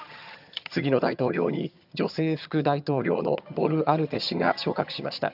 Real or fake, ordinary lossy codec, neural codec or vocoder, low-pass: fake; none; vocoder, 22.05 kHz, 80 mel bands, HiFi-GAN; 5.4 kHz